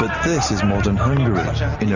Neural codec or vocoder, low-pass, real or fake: none; 7.2 kHz; real